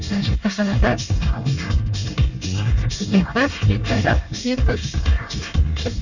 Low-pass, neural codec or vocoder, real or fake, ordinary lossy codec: 7.2 kHz; codec, 24 kHz, 1 kbps, SNAC; fake; none